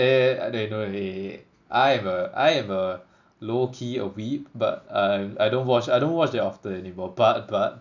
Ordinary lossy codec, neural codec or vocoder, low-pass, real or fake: none; none; 7.2 kHz; real